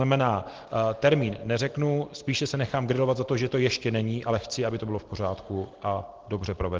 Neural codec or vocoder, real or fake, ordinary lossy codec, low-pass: none; real; Opus, 16 kbps; 7.2 kHz